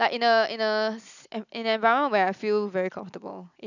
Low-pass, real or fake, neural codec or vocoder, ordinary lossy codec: 7.2 kHz; real; none; none